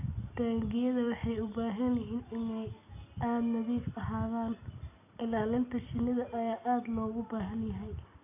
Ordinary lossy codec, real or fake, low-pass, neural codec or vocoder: none; real; 3.6 kHz; none